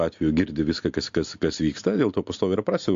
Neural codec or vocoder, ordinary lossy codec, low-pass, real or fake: none; AAC, 64 kbps; 7.2 kHz; real